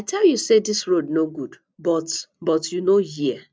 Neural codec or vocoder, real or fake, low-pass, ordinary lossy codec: none; real; none; none